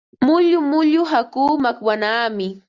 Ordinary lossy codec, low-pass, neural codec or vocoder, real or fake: Opus, 64 kbps; 7.2 kHz; none; real